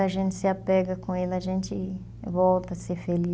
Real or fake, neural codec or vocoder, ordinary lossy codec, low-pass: real; none; none; none